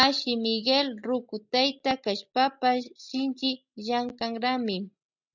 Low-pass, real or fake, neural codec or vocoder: 7.2 kHz; real; none